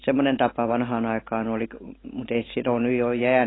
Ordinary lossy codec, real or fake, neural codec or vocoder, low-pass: AAC, 16 kbps; real; none; 7.2 kHz